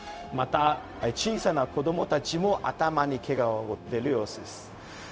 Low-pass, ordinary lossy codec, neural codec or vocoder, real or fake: none; none; codec, 16 kHz, 0.4 kbps, LongCat-Audio-Codec; fake